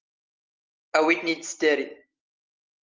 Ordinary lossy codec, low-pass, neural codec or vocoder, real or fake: Opus, 24 kbps; 7.2 kHz; none; real